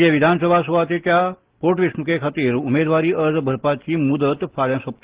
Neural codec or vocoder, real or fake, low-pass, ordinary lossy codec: none; real; 3.6 kHz; Opus, 16 kbps